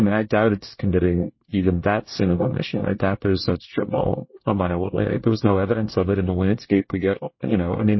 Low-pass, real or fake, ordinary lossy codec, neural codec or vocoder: 7.2 kHz; fake; MP3, 24 kbps; codec, 24 kHz, 1 kbps, SNAC